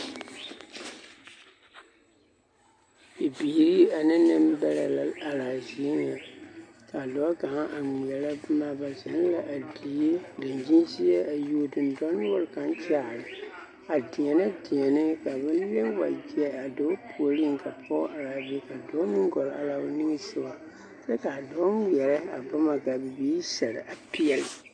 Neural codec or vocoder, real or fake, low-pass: none; real; 9.9 kHz